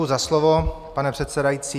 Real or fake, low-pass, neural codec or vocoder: real; 14.4 kHz; none